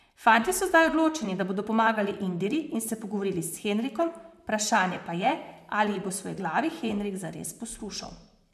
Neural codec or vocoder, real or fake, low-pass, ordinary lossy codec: vocoder, 44.1 kHz, 128 mel bands, Pupu-Vocoder; fake; 14.4 kHz; none